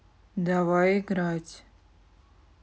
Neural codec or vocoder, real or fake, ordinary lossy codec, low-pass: none; real; none; none